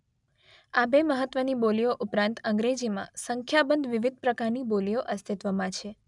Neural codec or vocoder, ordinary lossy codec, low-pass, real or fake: none; none; 10.8 kHz; real